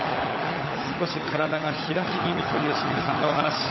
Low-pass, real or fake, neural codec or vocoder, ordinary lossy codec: 7.2 kHz; fake; codec, 16 kHz, 16 kbps, FunCodec, trained on LibriTTS, 50 frames a second; MP3, 24 kbps